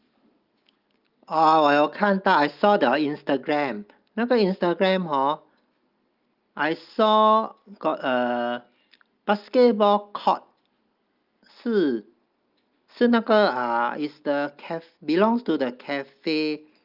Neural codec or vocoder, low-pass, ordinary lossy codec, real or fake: none; 5.4 kHz; Opus, 24 kbps; real